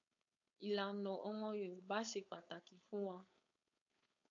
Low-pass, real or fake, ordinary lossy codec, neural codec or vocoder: 7.2 kHz; fake; AAC, 64 kbps; codec, 16 kHz, 4.8 kbps, FACodec